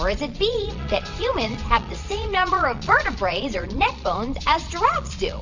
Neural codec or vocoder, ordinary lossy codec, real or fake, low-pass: vocoder, 22.05 kHz, 80 mel bands, Vocos; MP3, 64 kbps; fake; 7.2 kHz